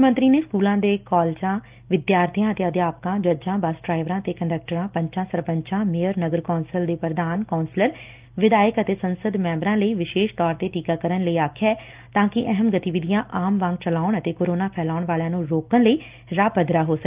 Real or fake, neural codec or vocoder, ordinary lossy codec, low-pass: real; none; Opus, 32 kbps; 3.6 kHz